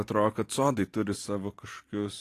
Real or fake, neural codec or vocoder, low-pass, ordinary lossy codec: fake; vocoder, 44.1 kHz, 128 mel bands every 512 samples, BigVGAN v2; 14.4 kHz; AAC, 48 kbps